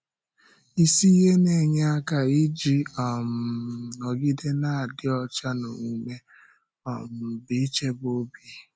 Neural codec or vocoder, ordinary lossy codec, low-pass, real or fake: none; none; none; real